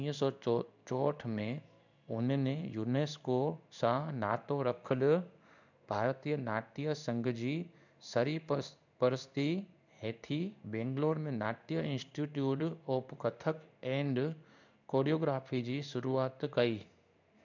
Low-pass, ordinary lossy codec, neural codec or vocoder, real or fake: 7.2 kHz; none; codec, 16 kHz in and 24 kHz out, 1 kbps, XY-Tokenizer; fake